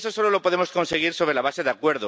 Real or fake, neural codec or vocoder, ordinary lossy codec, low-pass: real; none; none; none